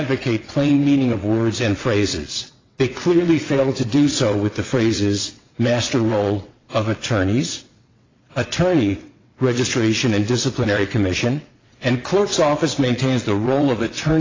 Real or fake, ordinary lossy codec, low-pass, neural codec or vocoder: fake; AAC, 32 kbps; 7.2 kHz; vocoder, 22.05 kHz, 80 mel bands, WaveNeXt